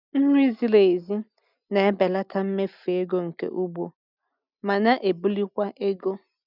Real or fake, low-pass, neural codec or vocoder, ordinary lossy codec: real; 5.4 kHz; none; none